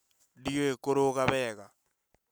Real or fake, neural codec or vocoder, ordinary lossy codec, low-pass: real; none; none; none